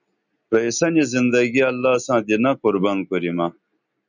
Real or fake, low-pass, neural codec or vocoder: real; 7.2 kHz; none